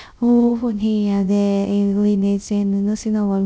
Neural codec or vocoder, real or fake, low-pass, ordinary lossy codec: codec, 16 kHz, 0.3 kbps, FocalCodec; fake; none; none